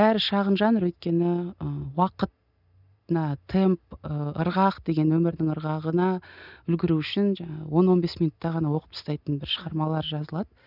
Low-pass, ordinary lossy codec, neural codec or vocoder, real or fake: 5.4 kHz; none; none; real